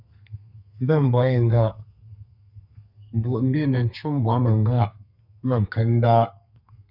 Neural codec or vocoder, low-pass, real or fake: codec, 32 kHz, 1.9 kbps, SNAC; 5.4 kHz; fake